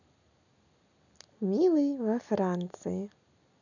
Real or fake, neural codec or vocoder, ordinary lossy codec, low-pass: real; none; none; 7.2 kHz